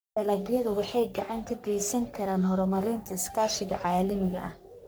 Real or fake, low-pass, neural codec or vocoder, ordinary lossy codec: fake; none; codec, 44.1 kHz, 3.4 kbps, Pupu-Codec; none